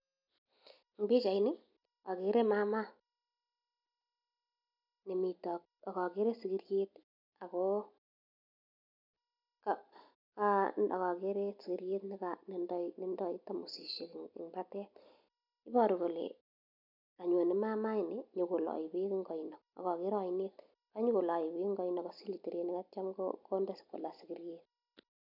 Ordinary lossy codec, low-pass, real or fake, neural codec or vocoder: none; 5.4 kHz; real; none